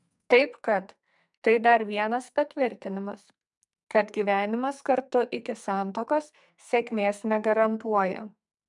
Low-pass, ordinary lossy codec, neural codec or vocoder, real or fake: 10.8 kHz; MP3, 96 kbps; codec, 44.1 kHz, 2.6 kbps, SNAC; fake